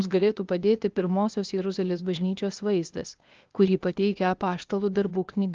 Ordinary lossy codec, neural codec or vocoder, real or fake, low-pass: Opus, 24 kbps; codec, 16 kHz, 0.8 kbps, ZipCodec; fake; 7.2 kHz